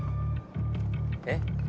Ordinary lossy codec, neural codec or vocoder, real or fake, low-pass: none; none; real; none